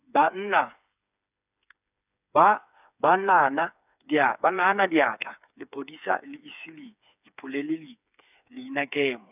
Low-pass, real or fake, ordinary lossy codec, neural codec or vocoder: 3.6 kHz; fake; none; codec, 16 kHz, 4 kbps, FreqCodec, smaller model